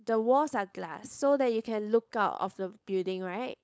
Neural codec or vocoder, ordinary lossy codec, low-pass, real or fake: codec, 16 kHz, 4.8 kbps, FACodec; none; none; fake